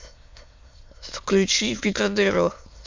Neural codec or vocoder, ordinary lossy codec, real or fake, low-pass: autoencoder, 22.05 kHz, a latent of 192 numbers a frame, VITS, trained on many speakers; MP3, 64 kbps; fake; 7.2 kHz